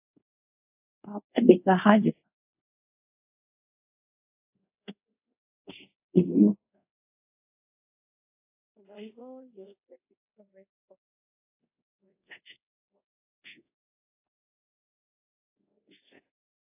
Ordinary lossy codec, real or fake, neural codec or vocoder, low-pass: AAC, 32 kbps; fake; codec, 24 kHz, 0.5 kbps, DualCodec; 3.6 kHz